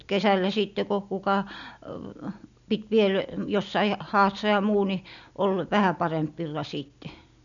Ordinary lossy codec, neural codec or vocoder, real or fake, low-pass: none; none; real; 7.2 kHz